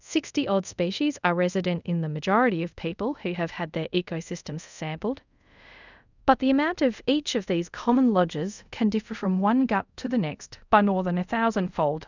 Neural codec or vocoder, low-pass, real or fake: codec, 24 kHz, 0.5 kbps, DualCodec; 7.2 kHz; fake